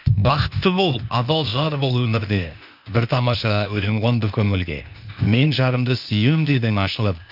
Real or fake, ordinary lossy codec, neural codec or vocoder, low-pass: fake; none; codec, 16 kHz, 0.8 kbps, ZipCodec; 5.4 kHz